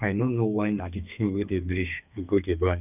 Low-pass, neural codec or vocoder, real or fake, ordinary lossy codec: 3.6 kHz; codec, 32 kHz, 1.9 kbps, SNAC; fake; none